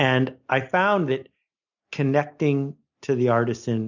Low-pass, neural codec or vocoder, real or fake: 7.2 kHz; none; real